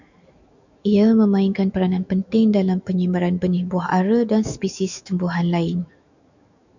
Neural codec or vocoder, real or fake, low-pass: autoencoder, 48 kHz, 128 numbers a frame, DAC-VAE, trained on Japanese speech; fake; 7.2 kHz